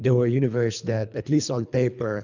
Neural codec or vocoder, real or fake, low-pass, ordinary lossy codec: codec, 24 kHz, 3 kbps, HILCodec; fake; 7.2 kHz; MP3, 64 kbps